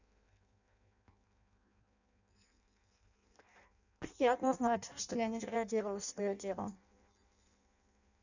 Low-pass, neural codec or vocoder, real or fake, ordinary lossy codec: 7.2 kHz; codec, 16 kHz in and 24 kHz out, 0.6 kbps, FireRedTTS-2 codec; fake; none